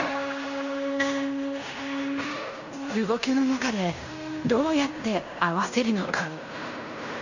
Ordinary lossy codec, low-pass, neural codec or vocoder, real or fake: none; 7.2 kHz; codec, 16 kHz in and 24 kHz out, 0.9 kbps, LongCat-Audio-Codec, fine tuned four codebook decoder; fake